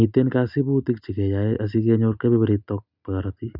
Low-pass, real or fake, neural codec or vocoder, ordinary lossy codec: 5.4 kHz; real; none; none